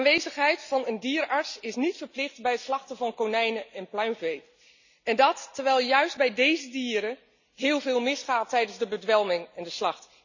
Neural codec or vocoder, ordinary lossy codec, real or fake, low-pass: none; none; real; 7.2 kHz